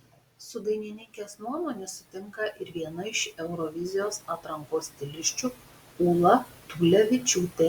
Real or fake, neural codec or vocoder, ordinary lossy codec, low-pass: real; none; Opus, 64 kbps; 19.8 kHz